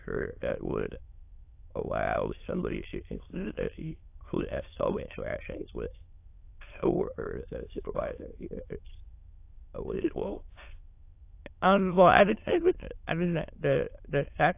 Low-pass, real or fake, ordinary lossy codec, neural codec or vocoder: 3.6 kHz; fake; AAC, 24 kbps; autoencoder, 22.05 kHz, a latent of 192 numbers a frame, VITS, trained on many speakers